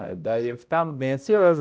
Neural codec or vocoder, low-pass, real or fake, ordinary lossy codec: codec, 16 kHz, 0.5 kbps, X-Codec, HuBERT features, trained on balanced general audio; none; fake; none